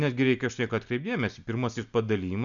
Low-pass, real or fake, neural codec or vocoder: 7.2 kHz; real; none